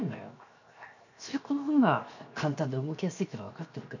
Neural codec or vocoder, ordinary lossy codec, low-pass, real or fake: codec, 16 kHz, 0.7 kbps, FocalCodec; none; 7.2 kHz; fake